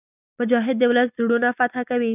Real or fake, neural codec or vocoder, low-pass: real; none; 3.6 kHz